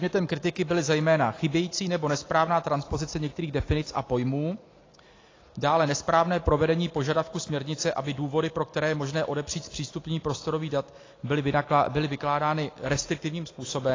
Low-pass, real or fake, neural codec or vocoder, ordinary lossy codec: 7.2 kHz; real; none; AAC, 32 kbps